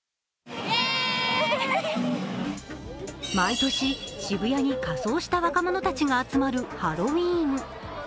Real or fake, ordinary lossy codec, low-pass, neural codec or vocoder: real; none; none; none